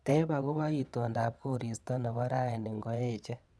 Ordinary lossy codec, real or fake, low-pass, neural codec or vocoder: none; fake; none; vocoder, 22.05 kHz, 80 mel bands, WaveNeXt